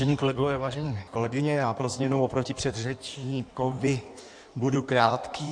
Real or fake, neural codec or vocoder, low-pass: fake; codec, 16 kHz in and 24 kHz out, 1.1 kbps, FireRedTTS-2 codec; 9.9 kHz